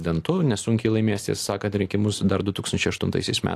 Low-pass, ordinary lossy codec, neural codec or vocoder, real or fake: 14.4 kHz; AAC, 64 kbps; none; real